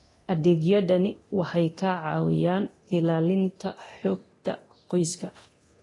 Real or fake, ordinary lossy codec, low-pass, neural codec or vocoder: fake; AAC, 32 kbps; 10.8 kHz; codec, 24 kHz, 0.9 kbps, DualCodec